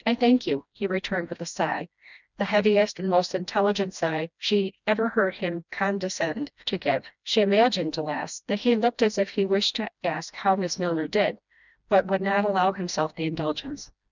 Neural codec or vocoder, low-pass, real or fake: codec, 16 kHz, 1 kbps, FreqCodec, smaller model; 7.2 kHz; fake